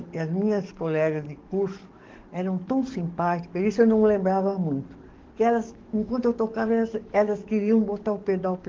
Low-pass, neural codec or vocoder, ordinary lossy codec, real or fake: 7.2 kHz; codec, 44.1 kHz, 7.8 kbps, DAC; Opus, 16 kbps; fake